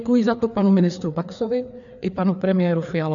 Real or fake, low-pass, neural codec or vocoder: fake; 7.2 kHz; codec, 16 kHz, 4 kbps, FreqCodec, larger model